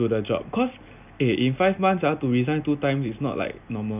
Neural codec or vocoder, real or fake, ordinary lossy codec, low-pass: none; real; none; 3.6 kHz